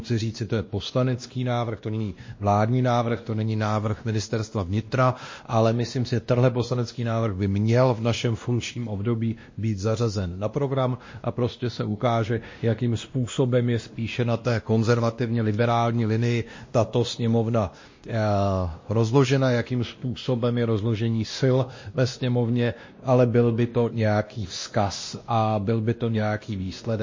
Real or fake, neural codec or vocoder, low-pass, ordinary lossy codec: fake; codec, 16 kHz, 1 kbps, X-Codec, WavLM features, trained on Multilingual LibriSpeech; 7.2 kHz; MP3, 32 kbps